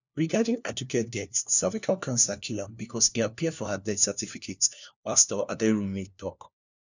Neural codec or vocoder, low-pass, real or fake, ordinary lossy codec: codec, 16 kHz, 1 kbps, FunCodec, trained on LibriTTS, 50 frames a second; 7.2 kHz; fake; none